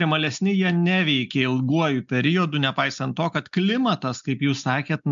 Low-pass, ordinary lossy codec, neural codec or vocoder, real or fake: 7.2 kHz; MP3, 64 kbps; none; real